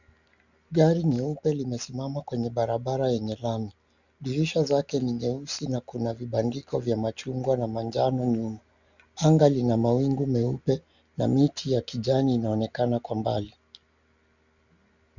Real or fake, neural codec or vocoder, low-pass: real; none; 7.2 kHz